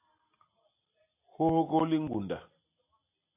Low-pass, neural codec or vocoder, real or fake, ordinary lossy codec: 3.6 kHz; none; real; AAC, 24 kbps